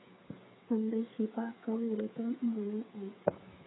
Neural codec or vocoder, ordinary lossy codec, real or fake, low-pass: codec, 16 kHz, 8 kbps, FreqCodec, smaller model; AAC, 16 kbps; fake; 7.2 kHz